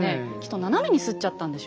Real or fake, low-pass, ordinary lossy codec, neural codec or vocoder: real; none; none; none